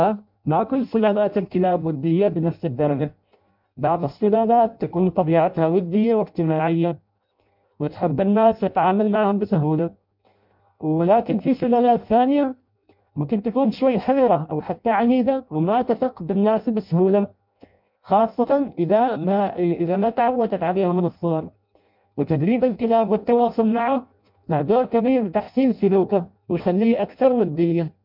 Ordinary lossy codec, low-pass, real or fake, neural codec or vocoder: MP3, 48 kbps; 5.4 kHz; fake; codec, 16 kHz in and 24 kHz out, 0.6 kbps, FireRedTTS-2 codec